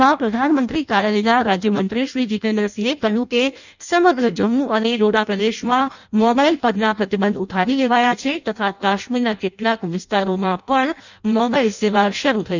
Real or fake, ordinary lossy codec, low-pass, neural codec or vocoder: fake; none; 7.2 kHz; codec, 16 kHz in and 24 kHz out, 0.6 kbps, FireRedTTS-2 codec